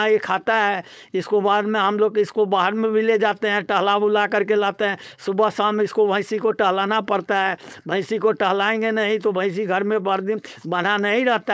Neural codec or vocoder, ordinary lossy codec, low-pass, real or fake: codec, 16 kHz, 4.8 kbps, FACodec; none; none; fake